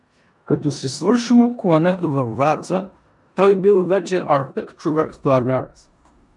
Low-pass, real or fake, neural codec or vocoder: 10.8 kHz; fake; codec, 16 kHz in and 24 kHz out, 0.9 kbps, LongCat-Audio-Codec, four codebook decoder